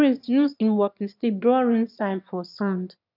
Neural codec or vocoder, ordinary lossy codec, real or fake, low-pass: autoencoder, 22.05 kHz, a latent of 192 numbers a frame, VITS, trained on one speaker; none; fake; 5.4 kHz